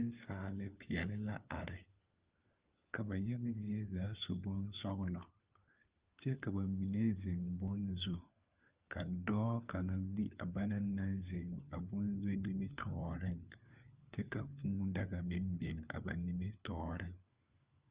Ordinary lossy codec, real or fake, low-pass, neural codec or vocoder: Opus, 32 kbps; fake; 3.6 kHz; codec, 16 kHz, 4 kbps, FunCodec, trained on LibriTTS, 50 frames a second